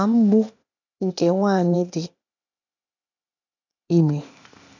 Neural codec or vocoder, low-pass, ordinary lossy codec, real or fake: codec, 16 kHz, 0.8 kbps, ZipCodec; 7.2 kHz; none; fake